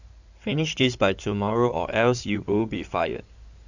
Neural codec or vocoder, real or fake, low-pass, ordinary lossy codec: codec, 16 kHz in and 24 kHz out, 2.2 kbps, FireRedTTS-2 codec; fake; 7.2 kHz; none